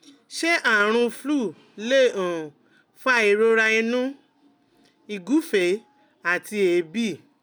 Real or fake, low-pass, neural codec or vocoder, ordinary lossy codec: real; none; none; none